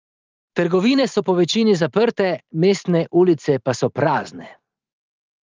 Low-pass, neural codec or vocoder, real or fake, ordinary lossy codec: 7.2 kHz; vocoder, 44.1 kHz, 128 mel bands every 512 samples, BigVGAN v2; fake; Opus, 32 kbps